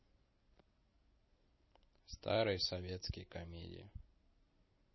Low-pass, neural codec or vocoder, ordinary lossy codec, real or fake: 7.2 kHz; none; MP3, 24 kbps; real